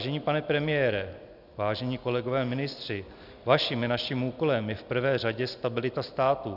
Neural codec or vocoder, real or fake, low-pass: none; real; 5.4 kHz